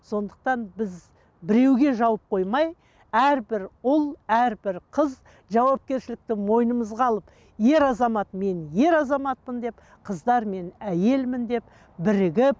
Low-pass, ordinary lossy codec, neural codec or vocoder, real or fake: none; none; none; real